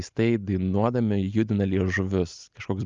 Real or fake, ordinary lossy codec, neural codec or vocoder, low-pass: real; Opus, 24 kbps; none; 7.2 kHz